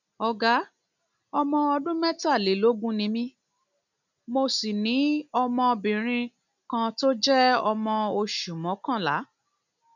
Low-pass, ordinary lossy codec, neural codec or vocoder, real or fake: 7.2 kHz; none; none; real